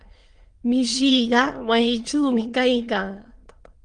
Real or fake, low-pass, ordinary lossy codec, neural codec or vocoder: fake; 9.9 kHz; Opus, 24 kbps; autoencoder, 22.05 kHz, a latent of 192 numbers a frame, VITS, trained on many speakers